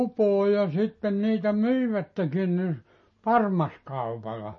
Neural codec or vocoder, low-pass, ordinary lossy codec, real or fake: none; 7.2 kHz; MP3, 32 kbps; real